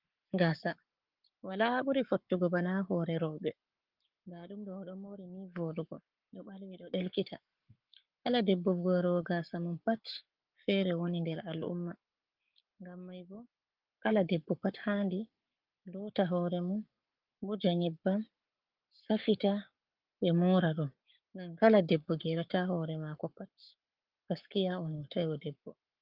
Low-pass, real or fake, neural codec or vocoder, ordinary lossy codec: 5.4 kHz; fake; codec, 44.1 kHz, 7.8 kbps, Pupu-Codec; Opus, 32 kbps